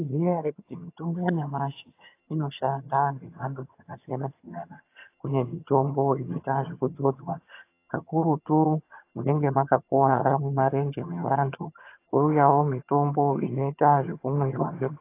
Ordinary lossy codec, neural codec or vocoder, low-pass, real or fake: AAC, 24 kbps; vocoder, 22.05 kHz, 80 mel bands, HiFi-GAN; 3.6 kHz; fake